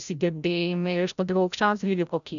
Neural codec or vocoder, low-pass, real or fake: codec, 16 kHz, 0.5 kbps, FreqCodec, larger model; 7.2 kHz; fake